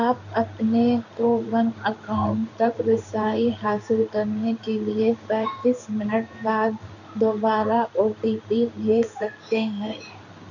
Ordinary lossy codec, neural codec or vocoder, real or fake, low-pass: none; codec, 16 kHz in and 24 kHz out, 1 kbps, XY-Tokenizer; fake; 7.2 kHz